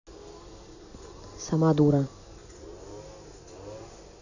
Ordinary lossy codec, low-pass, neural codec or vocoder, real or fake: none; 7.2 kHz; none; real